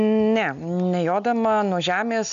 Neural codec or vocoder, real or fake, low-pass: none; real; 7.2 kHz